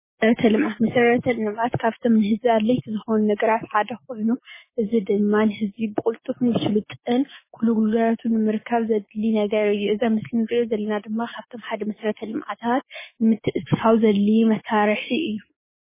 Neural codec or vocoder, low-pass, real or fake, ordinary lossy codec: codec, 16 kHz, 6 kbps, DAC; 3.6 kHz; fake; MP3, 16 kbps